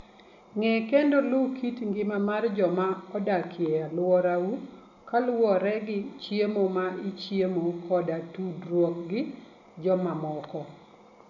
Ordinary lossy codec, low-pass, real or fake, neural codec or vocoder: none; 7.2 kHz; real; none